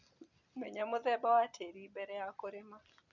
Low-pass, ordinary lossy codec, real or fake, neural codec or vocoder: 7.2 kHz; none; real; none